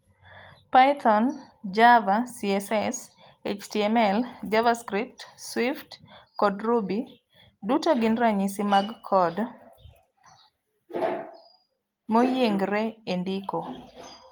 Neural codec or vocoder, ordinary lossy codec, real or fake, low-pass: none; Opus, 32 kbps; real; 19.8 kHz